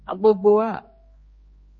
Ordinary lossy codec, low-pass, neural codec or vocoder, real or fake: MP3, 32 kbps; 7.2 kHz; codec, 16 kHz, 2 kbps, X-Codec, HuBERT features, trained on general audio; fake